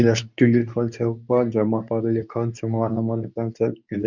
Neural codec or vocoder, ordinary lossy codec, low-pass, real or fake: codec, 24 kHz, 0.9 kbps, WavTokenizer, medium speech release version 2; none; 7.2 kHz; fake